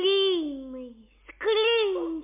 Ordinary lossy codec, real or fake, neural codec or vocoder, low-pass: MP3, 32 kbps; real; none; 3.6 kHz